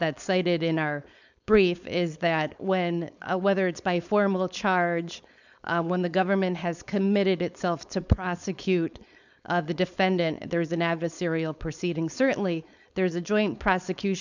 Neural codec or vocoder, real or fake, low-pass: codec, 16 kHz, 4.8 kbps, FACodec; fake; 7.2 kHz